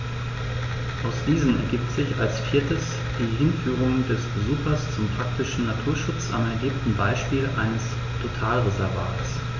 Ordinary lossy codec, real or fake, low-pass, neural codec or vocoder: none; real; 7.2 kHz; none